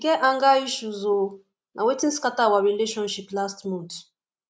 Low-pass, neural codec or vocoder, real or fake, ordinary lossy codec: none; none; real; none